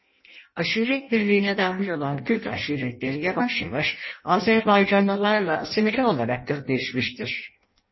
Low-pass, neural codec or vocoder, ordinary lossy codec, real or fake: 7.2 kHz; codec, 16 kHz in and 24 kHz out, 0.6 kbps, FireRedTTS-2 codec; MP3, 24 kbps; fake